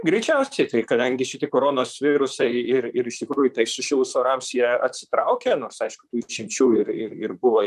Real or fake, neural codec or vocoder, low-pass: fake; vocoder, 44.1 kHz, 128 mel bands, Pupu-Vocoder; 14.4 kHz